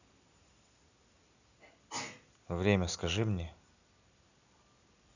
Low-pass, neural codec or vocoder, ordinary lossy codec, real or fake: 7.2 kHz; none; none; real